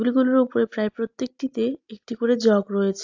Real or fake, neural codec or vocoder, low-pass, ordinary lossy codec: real; none; 7.2 kHz; AAC, 48 kbps